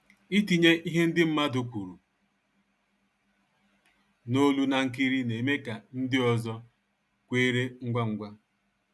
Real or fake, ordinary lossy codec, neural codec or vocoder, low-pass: real; none; none; none